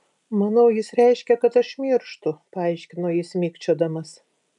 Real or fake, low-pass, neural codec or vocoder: real; 10.8 kHz; none